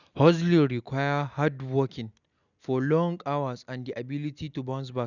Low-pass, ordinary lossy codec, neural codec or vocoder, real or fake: 7.2 kHz; none; none; real